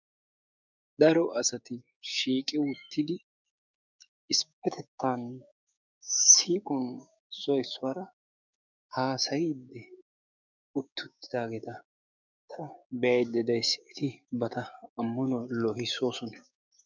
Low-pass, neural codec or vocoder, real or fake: 7.2 kHz; codec, 16 kHz, 6 kbps, DAC; fake